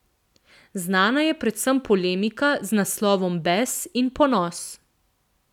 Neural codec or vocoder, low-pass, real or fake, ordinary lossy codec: none; 19.8 kHz; real; none